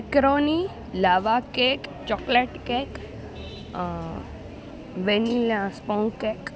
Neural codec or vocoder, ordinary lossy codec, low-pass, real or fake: none; none; none; real